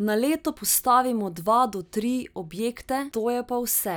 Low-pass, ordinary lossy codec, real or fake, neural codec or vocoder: none; none; real; none